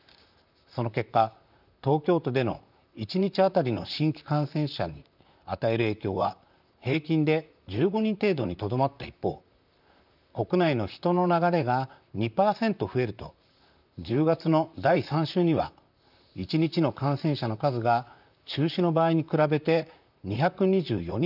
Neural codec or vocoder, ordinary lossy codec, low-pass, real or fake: vocoder, 44.1 kHz, 128 mel bands, Pupu-Vocoder; none; 5.4 kHz; fake